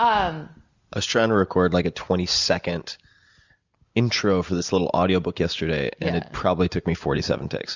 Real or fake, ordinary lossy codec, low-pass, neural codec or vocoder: real; Opus, 64 kbps; 7.2 kHz; none